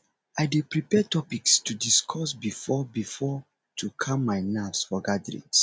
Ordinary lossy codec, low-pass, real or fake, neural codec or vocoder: none; none; real; none